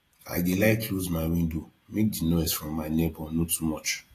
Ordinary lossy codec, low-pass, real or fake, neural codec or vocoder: AAC, 48 kbps; 14.4 kHz; fake; vocoder, 48 kHz, 128 mel bands, Vocos